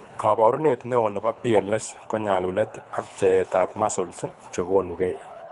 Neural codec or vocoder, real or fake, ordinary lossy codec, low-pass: codec, 24 kHz, 3 kbps, HILCodec; fake; none; 10.8 kHz